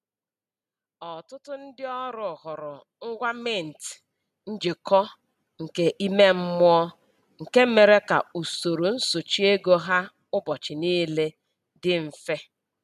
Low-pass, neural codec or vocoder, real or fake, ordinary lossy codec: 14.4 kHz; none; real; none